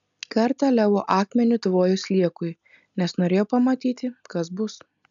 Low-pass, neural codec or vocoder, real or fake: 7.2 kHz; none; real